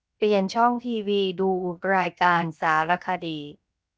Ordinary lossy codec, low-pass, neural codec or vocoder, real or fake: none; none; codec, 16 kHz, 0.7 kbps, FocalCodec; fake